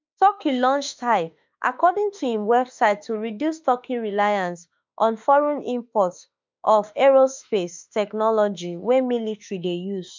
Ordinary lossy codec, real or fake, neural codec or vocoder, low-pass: MP3, 64 kbps; fake; autoencoder, 48 kHz, 32 numbers a frame, DAC-VAE, trained on Japanese speech; 7.2 kHz